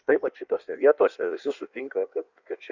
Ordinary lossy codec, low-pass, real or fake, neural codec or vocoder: Opus, 64 kbps; 7.2 kHz; fake; codec, 16 kHz in and 24 kHz out, 1.1 kbps, FireRedTTS-2 codec